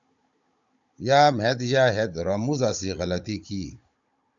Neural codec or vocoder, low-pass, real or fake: codec, 16 kHz, 16 kbps, FunCodec, trained on Chinese and English, 50 frames a second; 7.2 kHz; fake